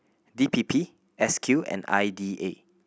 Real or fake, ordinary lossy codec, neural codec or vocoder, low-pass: real; none; none; none